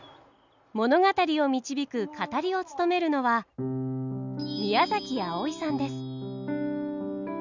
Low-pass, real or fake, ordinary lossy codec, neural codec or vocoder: 7.2 kHz; real; none; none